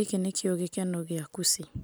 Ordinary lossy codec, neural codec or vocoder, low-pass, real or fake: none; none; none; real